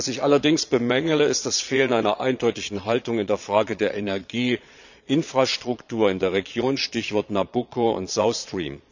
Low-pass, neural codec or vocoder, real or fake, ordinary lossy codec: 7.2 kHz; vocoder, 22.05 kHz, 80 mel bands, Vocos; fake; none